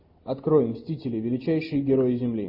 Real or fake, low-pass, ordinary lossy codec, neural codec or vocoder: real; 5.4 kHz; MP3, 32 kbps; none